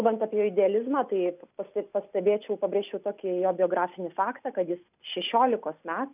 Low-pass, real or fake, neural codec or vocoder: 3.6 kHz; real; none